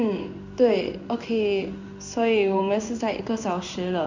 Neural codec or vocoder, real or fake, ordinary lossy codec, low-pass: codec, 16 kHz in and 24 kHz out, 1 kbps, XY-Tokenizer; fake; Opus, 64 kbps; 7.2 kHz